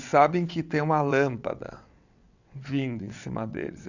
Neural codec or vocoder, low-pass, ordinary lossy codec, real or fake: vocoder, 22.05 kHz, 80 mel bands, WaveNeXt; 7.2 kHz; none; fake